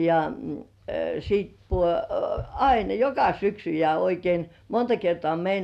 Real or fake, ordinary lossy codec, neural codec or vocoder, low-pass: real; none; none; 14.4 kHz